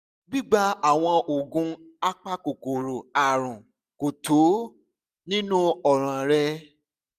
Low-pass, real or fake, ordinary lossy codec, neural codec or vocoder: 14.4 kHz; real; none; none